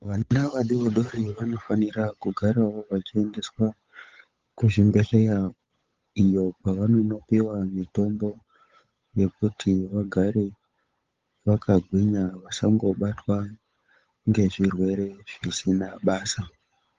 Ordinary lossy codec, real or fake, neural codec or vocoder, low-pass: Opus, 32 kbps; fake; codec, 16 kHz, 8 kbps, FunCodec, trained on Chinese and English, 25 frames a second; 7.2 kHz